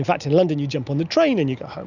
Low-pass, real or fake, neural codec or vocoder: 7.2 kHz; real; none